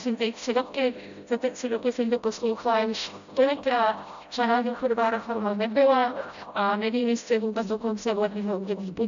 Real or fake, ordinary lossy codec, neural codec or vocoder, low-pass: fake; AAC, 96 kbps; codec, 16 kHz, 0.5 kbps, FreqCodec, smaller model; 7.2 kHz